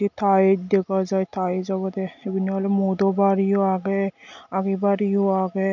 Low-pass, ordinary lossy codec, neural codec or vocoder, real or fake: 7.2 kHz; none; none; real